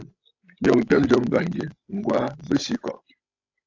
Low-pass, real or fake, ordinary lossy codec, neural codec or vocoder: 7.2 kHz; fake; AAC, 48 kbps; codec, 16 kHz, 16 kbps, FreqCodec, larger model